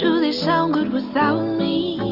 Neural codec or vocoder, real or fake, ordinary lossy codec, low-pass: none; real; AAC, 48 kbps; 5.4 kHz